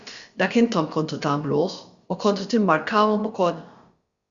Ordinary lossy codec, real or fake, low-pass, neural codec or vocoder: Opus, 64 kbps; fake; 7.2 kHz; codec, 16 kHz, about 1 kbps, DyCAST, with the encoder's durations